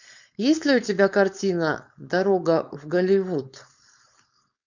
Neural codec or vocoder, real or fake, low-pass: codec, 16 kHz, 4.8 kbps, FACodec; fake; 7.2 kHz